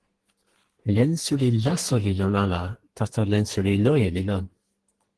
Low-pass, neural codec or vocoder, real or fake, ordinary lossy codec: 10.8 kHz; codec, 32 kHz, 1.9 kbps, SNAC; fake; Opus, 16 kbps